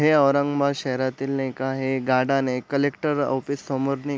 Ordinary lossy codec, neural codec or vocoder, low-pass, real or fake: none; none; none; real